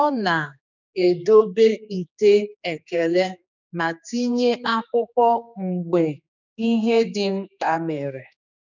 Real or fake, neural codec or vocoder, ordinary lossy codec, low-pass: fake; codec, 16 kHz, 2 kbps, X-Codec, HuBERT features, trained on general audio; none; 7.2 kHz